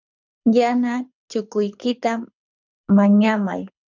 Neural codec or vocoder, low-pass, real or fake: codec, 24 kHz, 6 kbps, HILCodec; 7.2 kHz; fake